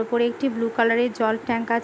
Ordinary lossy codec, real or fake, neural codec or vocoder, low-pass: none; real; none; none